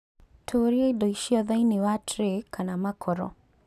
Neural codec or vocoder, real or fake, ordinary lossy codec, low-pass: none; real; none; 14.4 kHz